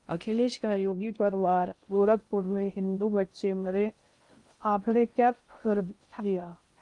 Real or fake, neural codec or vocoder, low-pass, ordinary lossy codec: fake; codec, 16 kHz in and 24 kHz out, 0.6 kbps, FocalCodec, streaming, 2048 codes; 10.8 kHz; Opus, 32 kbps